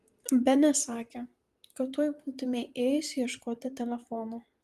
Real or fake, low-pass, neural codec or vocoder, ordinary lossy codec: fake; 14.4 kHz; vocoder, 44.1 kHz, 128 mel bands, Pupu-Vocoder; Opus, 32 kbps